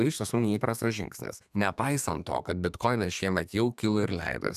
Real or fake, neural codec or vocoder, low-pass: fake; codec, 32 kHz, 1.9 kbps, SNAC; 14.4 kHz